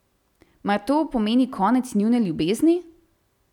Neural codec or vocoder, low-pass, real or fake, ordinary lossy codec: none; 19.8 kHz; real; none